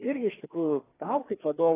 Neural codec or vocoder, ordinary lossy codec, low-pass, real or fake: codec, 16 kHz, 2 kbps, FreqCodec, larger model; AAC, 16 kbps; 3.6 kHz; fake